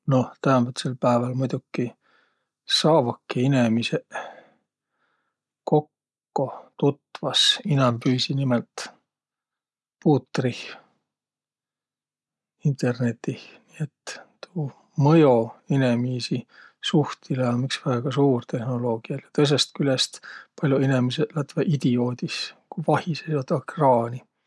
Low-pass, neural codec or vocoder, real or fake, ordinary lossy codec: none; none; real; none